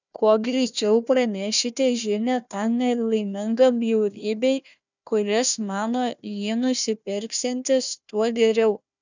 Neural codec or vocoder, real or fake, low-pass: codec, 16 kHz, 1 kbps, FunCodec, trained on Chinese and English, 50 frames a second; fake; 7.2 kHz